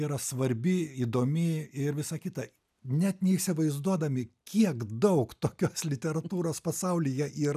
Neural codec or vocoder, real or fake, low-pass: none; real; 14.4 kHz